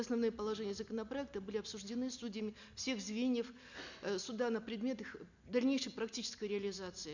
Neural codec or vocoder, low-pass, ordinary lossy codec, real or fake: none; 7.2 kHz; none; real